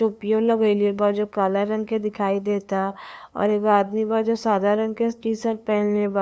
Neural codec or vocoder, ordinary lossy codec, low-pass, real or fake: codec, 16 kHz, 2 kbps, FunCodec, trained on LibriTTS, 25 frames a second; none; none; fake